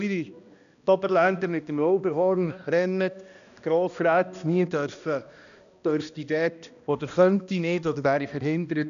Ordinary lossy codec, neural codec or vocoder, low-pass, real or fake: none; codec, 16 kHz, 1 kbps, X-Codec, HuBERT features, trained on balanced general audio; 7.2 kHz; fake